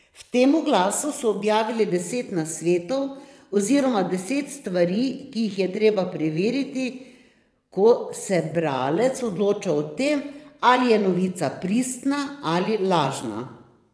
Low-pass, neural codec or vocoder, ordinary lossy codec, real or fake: none; vocoder, 22.05 kHz, 80 mel bands, WaveNeXt; none; fake